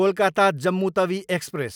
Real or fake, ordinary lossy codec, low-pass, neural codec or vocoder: real; none; 19.8 kHz; none